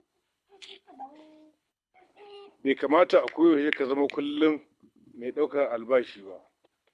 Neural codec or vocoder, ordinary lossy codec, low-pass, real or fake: codec, 24 kHz, 6 kbps, HILCodec; none; none; fake